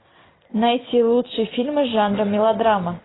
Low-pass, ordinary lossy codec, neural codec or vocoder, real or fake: 7.2 kHz; AAC, 16 kbps; none; real